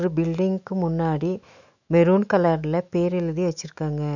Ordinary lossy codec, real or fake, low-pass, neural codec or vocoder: none; fake; 7.2 kHz; autoencoder, 48 kHz, 128 numbers a frame, DAC-VAE, trained on Japanese speech